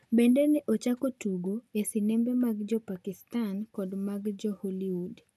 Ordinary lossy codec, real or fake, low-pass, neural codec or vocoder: none; real; 14.4 kHz; none